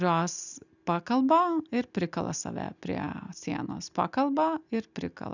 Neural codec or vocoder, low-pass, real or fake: none; 7.2 kHz; real